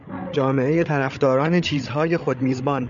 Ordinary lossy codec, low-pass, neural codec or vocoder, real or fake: MP3, 96 kbps; 7.2 kHz; codec, 16 kHz, 8 kbps, FreqCodec, larger model; fake